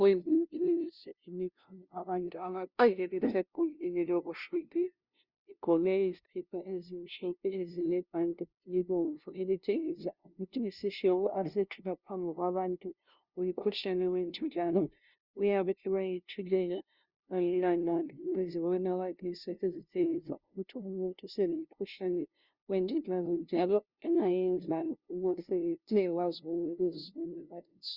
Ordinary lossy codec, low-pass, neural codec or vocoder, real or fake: Opus, 64 kbps; 5.4 kHz; codec, 16 kHz, 0.5 kbps, FunCodec, trained on LibriTTS, 25 frames a second; fake